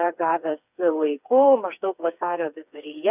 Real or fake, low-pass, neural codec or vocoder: fake; 3.6 kHz; codec, 16 kHz, 4 kbps, FreqCodec, smaller model